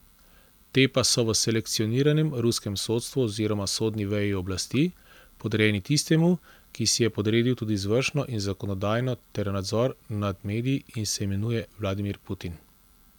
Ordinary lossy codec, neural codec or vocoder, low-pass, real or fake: none; none; 19.8 kHz; real